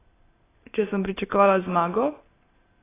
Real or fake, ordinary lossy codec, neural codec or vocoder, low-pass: real; AAC, 16 kbps; none; 3.6 kHz